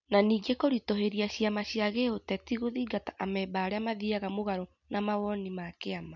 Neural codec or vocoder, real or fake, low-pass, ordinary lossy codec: none; real; 7.2 kHz; none